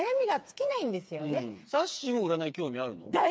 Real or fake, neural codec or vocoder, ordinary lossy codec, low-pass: fake; codec, 16 kHz, 4 kbps, FreqCodec, smaller model; none; none